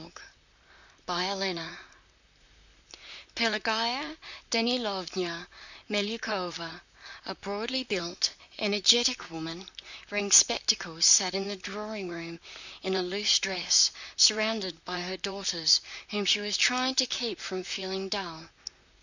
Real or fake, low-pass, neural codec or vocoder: fake; 7.2 kHz; vocoder, 44.1 kHz, 128 mel bands, Pupu-Vocoder